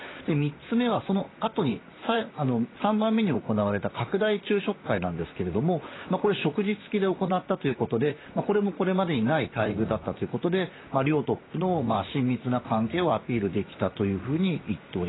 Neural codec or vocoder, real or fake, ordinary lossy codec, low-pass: vocoder, 44.1 kHz, 128 mel bands, Pupu-Vocoder; fake; AAC, 16 kbps; 7.2 kHz